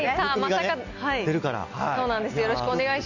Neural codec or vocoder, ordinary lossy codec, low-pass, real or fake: none; none; 7.2 kHz; real